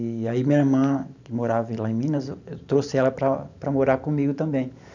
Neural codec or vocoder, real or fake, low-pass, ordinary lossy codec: vocoder, 44.1 kHz, 128 mel bands every 512 samples, BigVGAN v2; fake; 7.2 kHz; none